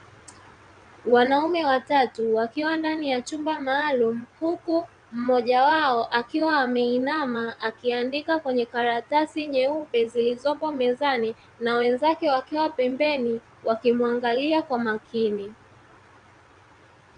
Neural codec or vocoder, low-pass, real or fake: vocoder, 22.05 kHz, 80 mel bands, WaveNeXt; 9.9 kHz; fake